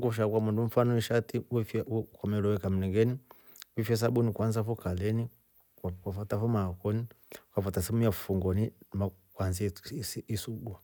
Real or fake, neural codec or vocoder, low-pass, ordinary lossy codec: real; none; none; none